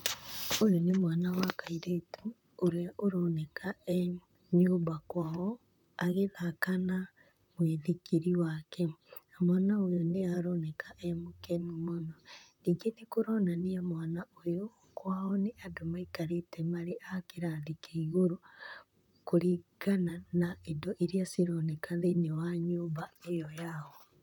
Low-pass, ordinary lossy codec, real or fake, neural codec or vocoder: 19.8 kHz; none; fake; vocoder, 44.1 kHz, 128 mel bands, Pupu-Vocoder